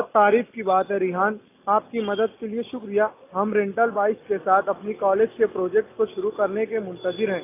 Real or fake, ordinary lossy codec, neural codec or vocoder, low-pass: real; AAC, 24 kbps; none; 3.6 kHz